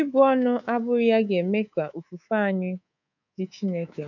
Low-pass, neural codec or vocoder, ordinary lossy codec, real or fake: 7.2 kHz; codec, 24 kHz, 3.1 kbps, DualCodec; none; fake